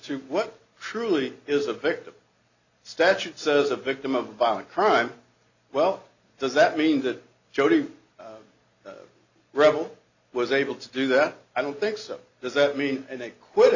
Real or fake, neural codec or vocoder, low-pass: real; none; 7.2 kHz